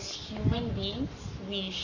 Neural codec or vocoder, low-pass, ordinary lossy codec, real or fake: codec, 44.1 kHz, 7.8 kbps, Pupu-Codec; 7.2 kHz; none; fake